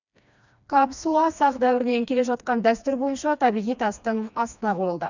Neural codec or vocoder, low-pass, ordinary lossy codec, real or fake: codec, 16 kHz, 2 kbps, FreqCodec, smaller model; 7.2 kHz; none; fake